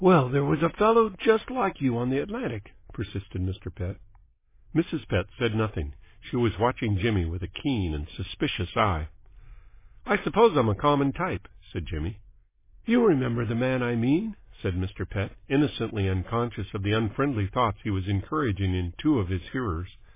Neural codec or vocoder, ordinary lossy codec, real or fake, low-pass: none; MP3, 16 kbps; real; 3.6 kHz